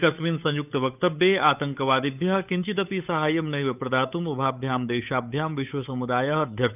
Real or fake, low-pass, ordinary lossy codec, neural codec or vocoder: fake; 3.6 kHz; none; codec, 16 kHz, 8 kbps, FunCodec, trained on Chinese and English, 25 frames a second